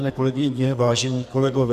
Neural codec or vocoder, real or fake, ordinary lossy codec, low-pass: codec, 44.1 kHz, 2.6 kbps, SNAC; fake; Opus, 64 kbps; 14.4 kHz